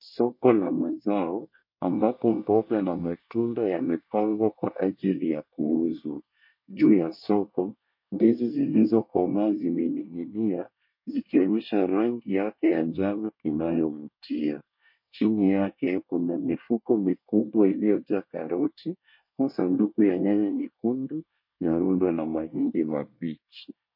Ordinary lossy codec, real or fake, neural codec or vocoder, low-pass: MP3, 32 kbps; fake; codec, 24 kHz, 1 kbps, SNAC; 5.4 kHz